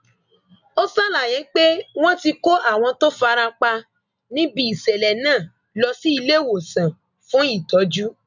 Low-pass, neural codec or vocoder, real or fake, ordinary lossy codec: 7.2 kHz; none; real; none